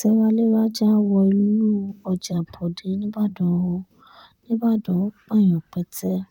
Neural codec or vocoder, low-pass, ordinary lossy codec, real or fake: vocoder, 44.1 kHz, 128 mel bands, Pupu-Vocoder; 19.8 kHz; none; fake